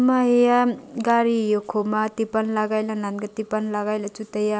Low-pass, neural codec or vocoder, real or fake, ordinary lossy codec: none; none; real; none